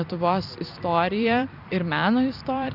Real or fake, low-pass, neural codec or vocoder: fake; 5.4 kHz; vocoder, 44.1 kHz, 128 mel bands every 256 samples, BigVGAN v2